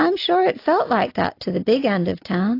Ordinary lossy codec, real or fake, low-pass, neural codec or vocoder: AAC, 32 kbps; real; 5.4 kHz; none